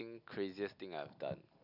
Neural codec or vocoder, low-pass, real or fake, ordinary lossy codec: none; 5.4 kHz; real; none